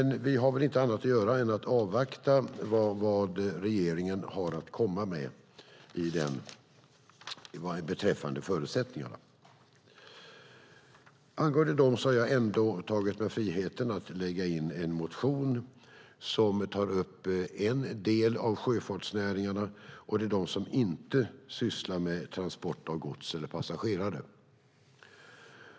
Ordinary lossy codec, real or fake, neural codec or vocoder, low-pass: none; real; none; none